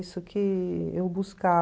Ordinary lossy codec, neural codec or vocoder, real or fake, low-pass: none; none; real; none